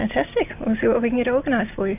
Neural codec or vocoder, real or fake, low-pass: none; real; 3.6 kHz